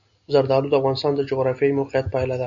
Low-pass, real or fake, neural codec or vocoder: 7.2 kHz; real; none